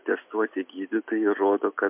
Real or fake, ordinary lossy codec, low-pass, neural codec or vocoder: real; MP3, 32 kbps; 3.6 kHz; none